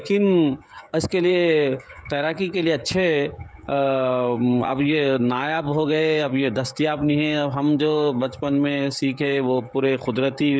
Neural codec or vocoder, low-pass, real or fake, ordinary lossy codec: codec, 16 kHz, 16 kbps, FreqCodec, smaller model; none; fake; none